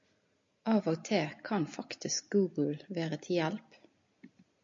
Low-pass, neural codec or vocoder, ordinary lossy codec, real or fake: 7.2 kHz; none; MP3, 48 kbps; real